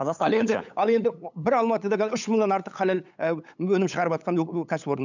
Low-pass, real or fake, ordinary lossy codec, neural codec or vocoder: 7.2 kHz; fake; none; codec, 16 kHz, 8 kbps, FunCodec, trained on LibriTTS, 25 frames a second